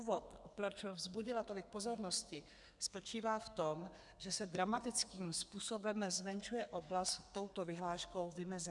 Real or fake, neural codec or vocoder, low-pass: fake; codec, 32 kHz, 1.9 kbps, SNAC; 10.8 kHz